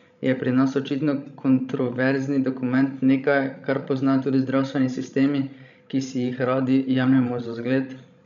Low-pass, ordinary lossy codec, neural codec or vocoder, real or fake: 7.2 kHz; none; codec, 16 kHz, 16 kbps, FreqCodec, larger model; fake